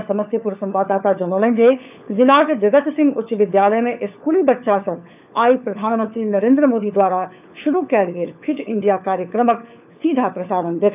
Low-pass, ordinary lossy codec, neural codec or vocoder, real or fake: 3.6 kHz; none; codec, 16 kHz, 4 kbps, FunCodec, trained on LibriTTS, 50 frames a second; fake